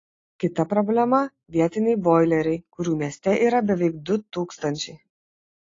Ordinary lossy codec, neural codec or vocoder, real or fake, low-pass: AAC, 32 kbps; none; real; 7.2 kHz